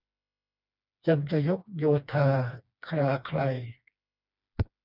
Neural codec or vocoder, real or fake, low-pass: codec, 16 kHz, 2 kbps, FreqCodec, smaller model; fake; 5.4 kHz